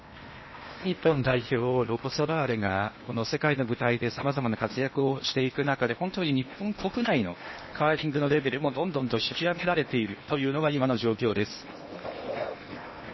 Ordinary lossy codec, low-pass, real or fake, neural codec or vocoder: MP3, 24 kbps; 7.2 kHz; fake; codec, 16 kHz in and 24 kHz out, 0.8 kbps, FocalCodec, streaming, 65536 codes